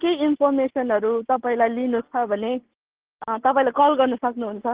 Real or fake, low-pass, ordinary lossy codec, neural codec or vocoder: real; 3.6 kHz; Opus, 16 kbps; none